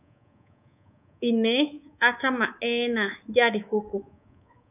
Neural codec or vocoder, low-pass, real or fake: codec, 24 kHz, 3.1 kbps, DualCodec; 3.6 kHz; fake